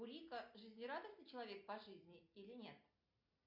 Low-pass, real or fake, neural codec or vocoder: 5.4 kHz; real; none